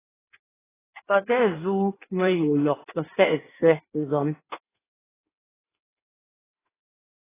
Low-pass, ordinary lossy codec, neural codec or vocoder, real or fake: 3.6 kHz; AAC, 16 kbps; codec, 16 kHz in and 24 kHz out, 1.1 kbps, FireRedTTS-2 codec; fake